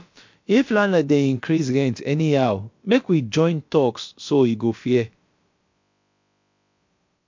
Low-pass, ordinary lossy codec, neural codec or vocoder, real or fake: 7.2 kHz; MP3, 48 kbps; codec, 16 kHz, about 1 kbps, DyCAST, with the encoder's durations; fake